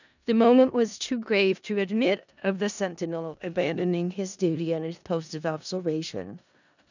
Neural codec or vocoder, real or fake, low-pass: codec, 16 kHz in and 24 kHz out, 0.4 kbps, LongCat-Audio-Codec, four codebook decoder; fake; 7.2 kHz